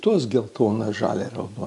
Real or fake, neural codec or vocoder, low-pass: real; none; 10.8 kHz